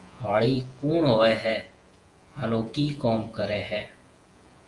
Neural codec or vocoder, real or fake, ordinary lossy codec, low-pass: vocoder, 48 kHz, 128 mel bands, Vocos; fake; Opus, 32 kbps; 10.8 kHz